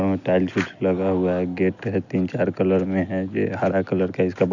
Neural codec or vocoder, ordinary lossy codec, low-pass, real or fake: none; none; 7.2 kHz; real